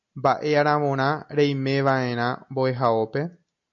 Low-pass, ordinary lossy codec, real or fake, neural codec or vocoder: 7.2 kHz; MP3, 48 kbps; real; none